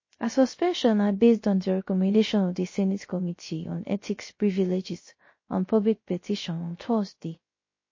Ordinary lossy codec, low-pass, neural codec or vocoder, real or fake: MP3, 32 kbps; 7.2 kHz; codec, 16 kHz, 0.3 kbps, FocalCodec; fake